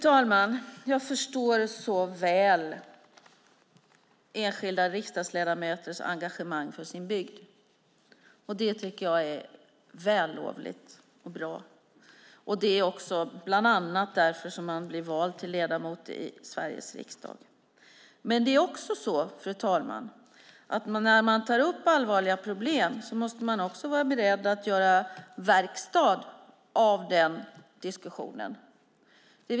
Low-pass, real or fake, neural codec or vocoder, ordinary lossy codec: none; real; none; none